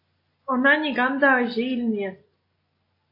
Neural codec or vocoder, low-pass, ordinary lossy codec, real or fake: none; 5.4 kHz; AAC, 32 kbps; real